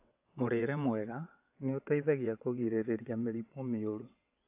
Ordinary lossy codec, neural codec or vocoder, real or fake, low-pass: AAC, 32 kbps; vocoder, 22.05 kHz, 80 mel bands, WaveNeXt; fake; 3.6 kHz